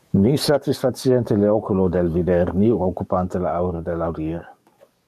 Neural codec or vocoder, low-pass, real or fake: codec, 44.1 kHz, 7.8 kbps, DAC; 14.4 kHz; fake